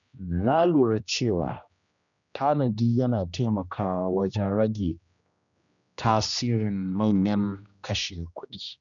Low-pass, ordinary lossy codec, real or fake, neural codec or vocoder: 7.2 kHz; none; fake; codec, 16 kHz, 1 kbps, X-Codec, HuBERT features, trained on general audio